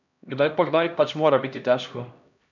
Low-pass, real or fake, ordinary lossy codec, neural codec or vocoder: 7.2 kHz; fake; none; codec, 16 kHz, 1 kbps, X-Codec, HuBERT features, trained on LibriSpeech